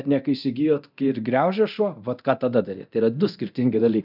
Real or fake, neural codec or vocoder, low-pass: fake; codec, 24 kHz, 0.9 kbps, DualCodec; 5.4 kHz